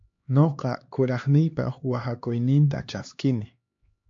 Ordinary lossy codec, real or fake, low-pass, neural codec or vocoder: AAC, 48 kbps; fake; 7.2 kHz; codec, 16 kHz, 2 kbps, X-Codec, HuBERT features, trained on LibriSpeech